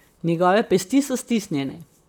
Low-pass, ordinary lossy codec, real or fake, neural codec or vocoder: none; none; fake; vocoder, 44.1 kHz, 128 mel bands, Pupu-Vocoder